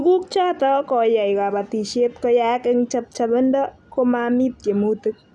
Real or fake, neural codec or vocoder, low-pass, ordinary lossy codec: real; none; none; none